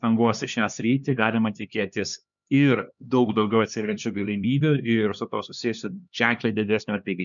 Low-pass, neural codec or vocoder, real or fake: 7.2 kHz; codec, 16 kHz, 2 kbps, X-Codec, HuBERT features, trained on LibriSpeech; fake